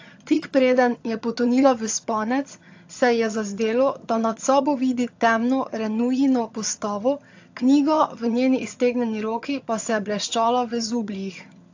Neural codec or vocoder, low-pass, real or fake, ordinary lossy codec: vocoder, 22.05 kHz, 80 mel bands, HiFi-GAN; 7.2 kHz; fake; AAC, 48 kbps